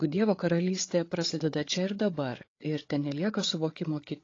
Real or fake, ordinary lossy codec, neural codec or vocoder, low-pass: fake; AAC, 32 kbps; codec, 16 kHz, 8 kbps, FreqCodec, larger model; 7.2 kHz